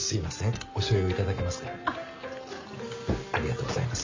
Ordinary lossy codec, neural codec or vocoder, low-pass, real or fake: MP3, 48 kbps; none; 7.2 kHz; real